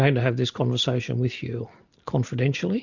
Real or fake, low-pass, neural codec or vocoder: real; 7.2 kHz; none